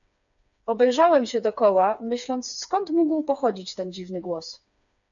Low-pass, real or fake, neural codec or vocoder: 7.2 kHz; fake; codec, 16 kHz, 4 kbps, FreqCodec, smaller model